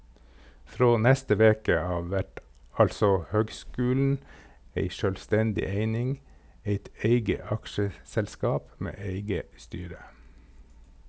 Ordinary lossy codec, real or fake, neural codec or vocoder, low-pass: none; real; none; none